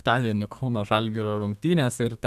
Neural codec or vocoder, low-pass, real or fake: codec, 32 kHz, 1.9 kbps, SNAC; 14.4 kHz; fake